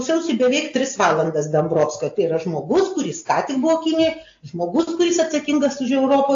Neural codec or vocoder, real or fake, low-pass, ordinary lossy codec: none; real; 7.2 kHz; AAC, 48 kbps